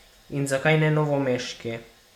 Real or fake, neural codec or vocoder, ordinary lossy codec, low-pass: real; none; none; 19.8 kHz